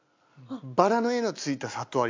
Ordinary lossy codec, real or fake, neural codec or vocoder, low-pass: MP3, 64 kbps; real; none; 7.2 kHz